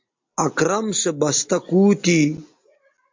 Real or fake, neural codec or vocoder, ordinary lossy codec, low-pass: real; none; MP3, 48 kbps; 7.2 kHz